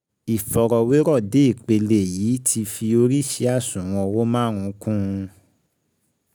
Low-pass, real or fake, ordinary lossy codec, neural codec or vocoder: none; real; none; none